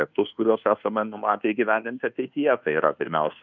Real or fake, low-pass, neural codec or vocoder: fake; 7.2 kHz; codec, 24 kHz, 1.2 kbps, DualCodec